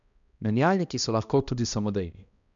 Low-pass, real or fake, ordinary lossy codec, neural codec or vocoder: 7.2 kHz; fake; none; codec, 16 kHz, 1 kbps, X-Codec, HuBERT features, trained on balanced general audio